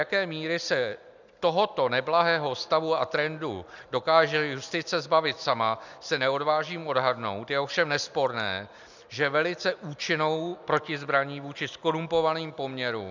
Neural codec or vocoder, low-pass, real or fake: none; 7.2 kHz; real